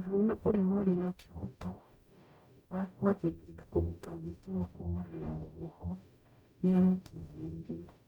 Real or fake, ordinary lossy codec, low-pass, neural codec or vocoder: fake; none; 19.8 kHz; codec, 44.1 kHz, 0.9 kbps, DAC